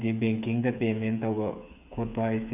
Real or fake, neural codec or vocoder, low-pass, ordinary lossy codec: fake; codec, 16 kHz, 8 kbps, FreqCodec, smaller model; 3.6 kHz; none